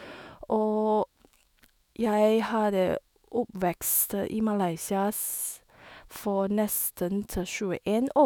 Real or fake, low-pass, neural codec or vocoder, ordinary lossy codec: fake; none; autoencoder, 48 kHz, 128 numbers a frame, DAC-VAE, trained on Japanese speech; none